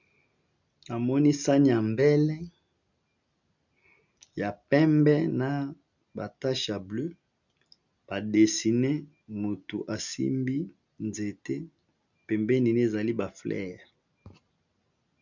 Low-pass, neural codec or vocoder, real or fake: 7.2 kHz; none; real